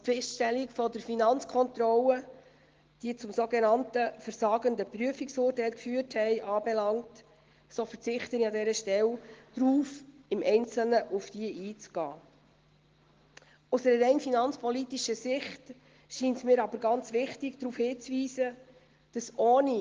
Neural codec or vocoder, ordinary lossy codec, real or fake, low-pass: none; Opus, 16 kbps; real; 7.2 kHz